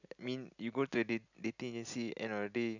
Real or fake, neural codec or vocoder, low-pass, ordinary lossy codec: real; none; 7.2 kHz; none